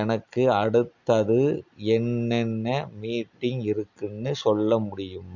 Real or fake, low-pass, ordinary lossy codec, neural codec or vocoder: real; 7.2 kHz; none; none